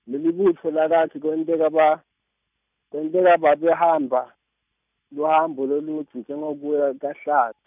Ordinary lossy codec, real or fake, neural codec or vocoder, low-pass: none; real; none; 3.6 kHz